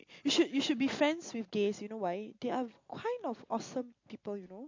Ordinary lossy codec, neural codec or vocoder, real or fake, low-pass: MP3, 48 kbps; none; real; 7.2 kHz